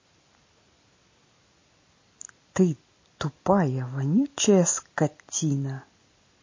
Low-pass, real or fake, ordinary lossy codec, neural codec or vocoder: 7.2 kHz; real; MP3, 32 kbps; none